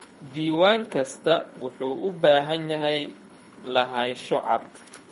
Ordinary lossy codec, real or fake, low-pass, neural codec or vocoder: MP3, 48 kbps; fake; 10.8 kHz; codec, 24 kHz, 3 kbps, HILCodec